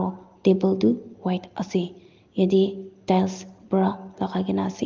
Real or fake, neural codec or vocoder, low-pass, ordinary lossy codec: real; none; 7.2 kHz; Opus, 32 kbps